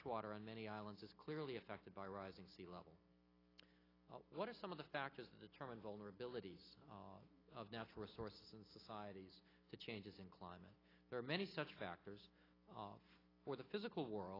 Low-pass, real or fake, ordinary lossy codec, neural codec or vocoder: 5.4 kHz; real; AAC, 24 kbps; none